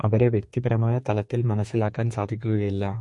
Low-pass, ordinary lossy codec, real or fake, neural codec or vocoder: 10.8 kHz; MP3, 48 kbps; fake; codec, 32 kHz, 1.9 kbps, SNAC